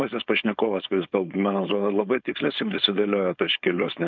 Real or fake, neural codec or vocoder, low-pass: fake; codec, 16 kHz, 4.8 kbps, FACodec; 7.2 kHz